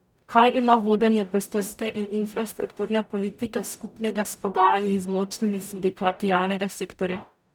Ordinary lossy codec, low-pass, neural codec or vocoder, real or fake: none; none; codec, 44.1 kHz, 0.9 kbps, DAC; fake